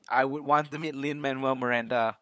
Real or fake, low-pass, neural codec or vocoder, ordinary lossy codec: fake; none; codec, 16 kHz, 8 kbps, FunCodec, trained on LibriTTS, 25 frames a second; none